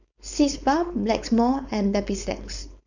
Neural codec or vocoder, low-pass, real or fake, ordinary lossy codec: codec, 16 kHz, 4.8 kbps, FACodec; 7.2 kHz; fake; none